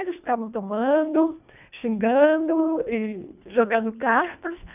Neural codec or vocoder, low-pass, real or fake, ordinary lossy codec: codec, 24 kHz, 1.5 kbps, HILCodec; 3.6 kHz; fake; none